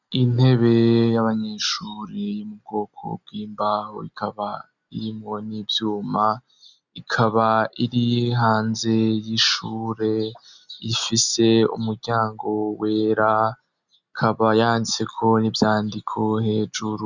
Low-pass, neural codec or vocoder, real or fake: 7.2 kHz; none; real